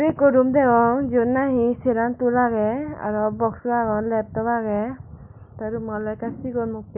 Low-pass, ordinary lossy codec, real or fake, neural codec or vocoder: 3.6 kHz; none; real; none